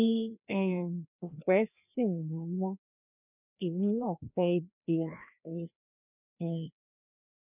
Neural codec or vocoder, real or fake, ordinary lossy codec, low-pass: codec, 16 kHz, 1 kbps, FreqCodec, larger model; fake; none; 3.6 kHz